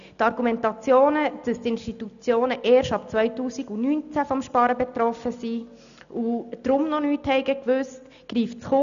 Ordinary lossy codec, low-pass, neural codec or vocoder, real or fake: none; 7.2 kHz; none; real